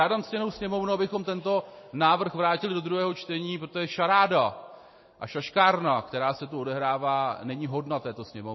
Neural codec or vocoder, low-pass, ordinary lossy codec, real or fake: none; 7.2 kHz; MP3, 24 kbps; real